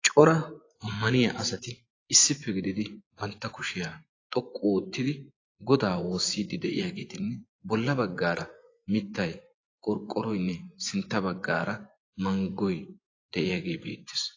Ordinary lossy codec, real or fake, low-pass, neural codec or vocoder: AAC, 32 kbps; fake; 7.2 kHz; vocoder, 24 kHz, 100 mel bands, Vocos